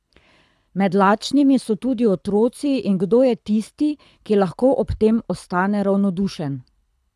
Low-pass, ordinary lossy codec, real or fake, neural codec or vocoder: none; none; fake; codec, 24 kHz, 6 kbps, HILCodec